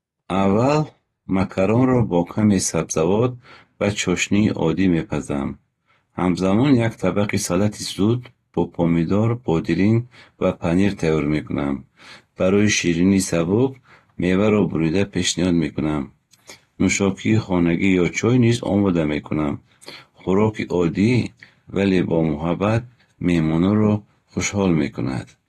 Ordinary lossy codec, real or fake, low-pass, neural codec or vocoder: AAC, 32 kbps; real; 19.8 kHz; none